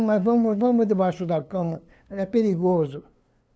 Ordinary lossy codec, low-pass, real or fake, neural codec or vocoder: none; none; fake; codec, 16 kHz, 2 kbps, FunCodec, trained on LibriTTS, 25 frames a second